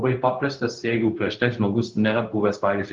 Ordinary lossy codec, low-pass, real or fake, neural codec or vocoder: Opus, 16 kbps; 7.2 kHz; fake; codec, 16 kHz, 0.4 kbps, LongCat-Audio-Codec